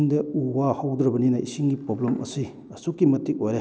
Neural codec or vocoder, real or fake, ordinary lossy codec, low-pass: none; real; none; none